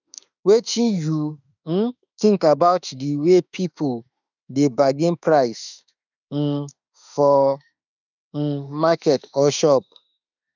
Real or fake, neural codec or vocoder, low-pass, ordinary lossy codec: fake; autoencoder, 48 kHz, 32 numbers a frame, DAC-VAE, trained on Japanese speech; 7.2 kHz; none